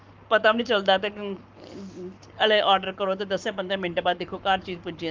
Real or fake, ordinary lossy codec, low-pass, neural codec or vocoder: fake; Opus, 24 kbps; 7.2 kHz; codec, 44.1 kHz, 7.8 kbps, Pupu-Codec